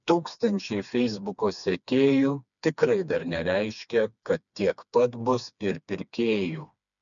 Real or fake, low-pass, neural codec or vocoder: fake; 7.2 kHz; codec, 16 kHz, 2 kbps, FreqCodec, smaller model